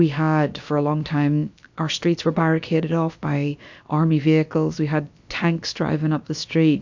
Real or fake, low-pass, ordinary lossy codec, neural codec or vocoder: fake; 7.2 kHz; MP3, 64 kbps; codec, 16 kHz, about 1 kbps, DyCAST, with the encoder's durations